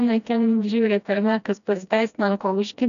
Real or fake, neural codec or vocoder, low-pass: fake; codec, 16 kHz, 1 kbps, FreqCodec, smaller model; 7.2 kHz